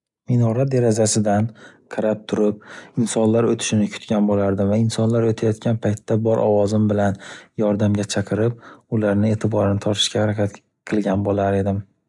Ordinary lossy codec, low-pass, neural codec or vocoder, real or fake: none; 10.8 kHz; none; real